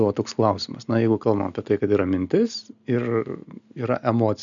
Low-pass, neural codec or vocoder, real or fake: 7.2 kHz; none; real